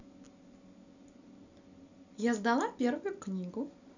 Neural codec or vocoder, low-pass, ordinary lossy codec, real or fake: none; 7.2 kHz; none; real